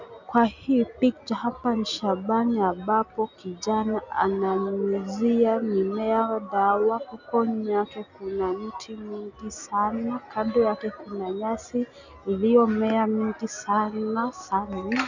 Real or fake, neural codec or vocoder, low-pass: real; none; 7.2 kHz